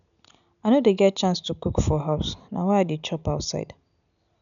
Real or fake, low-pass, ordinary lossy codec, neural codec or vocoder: real; 7.2 kHz; none; none